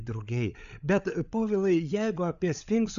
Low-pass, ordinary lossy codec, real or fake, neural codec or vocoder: 7.2 kHz; Opus, 64 kbps; fake; codec, 16 kHz, 8 kbps, FreqCodec, larger model